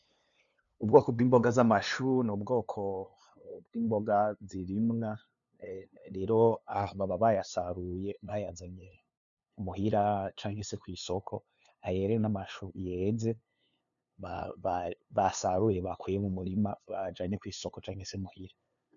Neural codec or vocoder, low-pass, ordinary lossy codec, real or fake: codec, 16 kHz, 2 kbps, FunCodec, trained on LibriTTS, 25 frames a second; 7.2 kHz; MP3, 96 kbps; fake